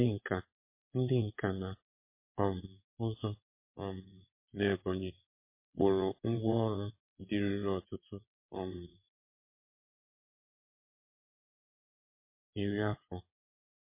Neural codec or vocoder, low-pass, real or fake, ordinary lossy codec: vocoder, 22.05 kHz, 80 mel bands, WaveNeXt; 3.6 kHz; fake; MP3, 24 kbps